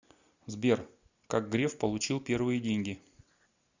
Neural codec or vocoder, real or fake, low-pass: none; real; 7.2 kHz